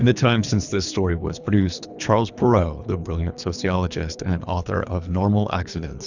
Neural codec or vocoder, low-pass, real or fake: codec, 24 kHz, 3 kbps, HILCodec; 7.2 kHz; fake